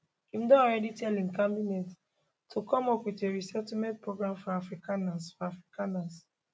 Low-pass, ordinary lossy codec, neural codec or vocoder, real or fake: none; none; none; real